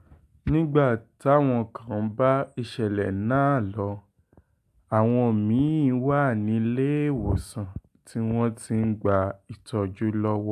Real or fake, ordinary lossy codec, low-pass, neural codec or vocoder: real; AAC, 96 kbps; 14.4 kHz; none